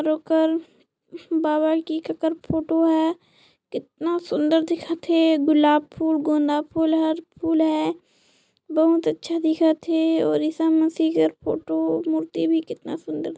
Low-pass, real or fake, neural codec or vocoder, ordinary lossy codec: none; real; none; none